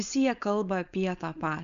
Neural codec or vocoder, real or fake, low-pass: codec, 16 kHz, 4.8 kbps, FACodec; fake; 7.2 kHz